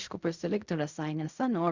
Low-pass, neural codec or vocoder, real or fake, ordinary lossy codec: 7.2 kHz; codec, 16 kHz in and 24 kHz out, 0.4 kbps, LongCat-Audio-Codec, fine tuned four codebook decoder; fake; Opus, 64 kbps